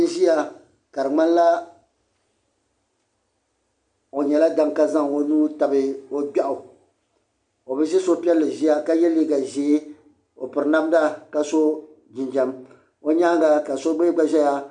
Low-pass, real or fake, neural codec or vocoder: 10.8 kHz; real; none